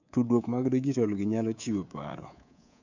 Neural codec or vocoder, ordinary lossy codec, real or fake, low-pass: codec, 24 kHz, 3.1 kbps, DualCodec; none; fake; 7.2 kHz